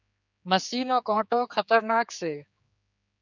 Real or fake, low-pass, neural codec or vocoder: fake; 7.2 kHz; codec, 16 kHz, 4 kbps, X-Codec, HuBERT features, trained on general audio